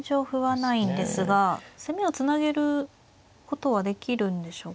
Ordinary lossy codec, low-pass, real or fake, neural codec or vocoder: none; none; real; none